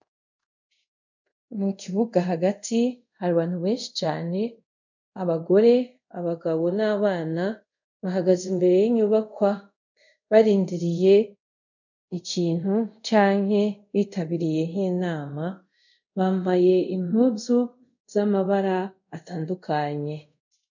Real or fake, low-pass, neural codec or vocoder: fake; 7.2 kHz; codec, 24 kHz, 0.5 kbps, DualCodec